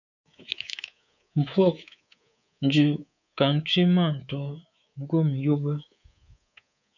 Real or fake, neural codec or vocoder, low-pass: fake; codec, 24 kHz, 3.1 kbps, DualCodec; 7.2 kHz